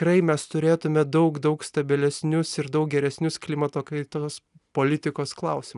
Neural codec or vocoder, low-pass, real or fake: none; 10.8 kHz; real